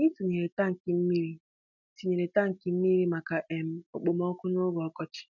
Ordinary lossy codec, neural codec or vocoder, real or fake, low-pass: none; none; real; 7.2 kHz